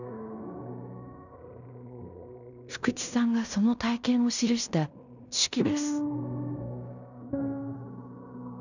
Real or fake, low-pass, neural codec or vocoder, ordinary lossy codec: fake; 7.2 kHz; codec, 16 kHz in and 24 kHz out, 0.9 kbps, LongCat-Audio-Codec, fine tuned four codebook decoder; none